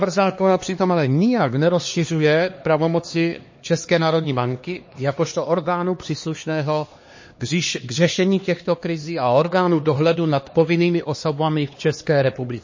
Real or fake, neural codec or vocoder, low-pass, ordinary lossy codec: fake; codec, 16 kHz, 2 kbps, X-Codec, HuBERT features, trained on LibriSpeech; 7.2 kHz; MP3, 32 kbps